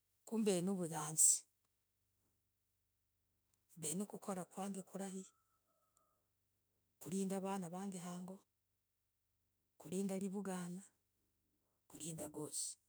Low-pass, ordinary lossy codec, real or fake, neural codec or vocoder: none; none; fake; autoencoder, 48 kHz, 32 numbers a frame, DAC-VAE, trained on Japanese speech